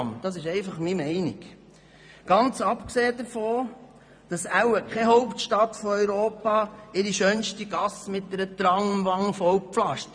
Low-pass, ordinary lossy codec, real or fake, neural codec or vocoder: 9.9 kHz; none; fake; vocoder, 24 kHz, 100 mel bands, Vocos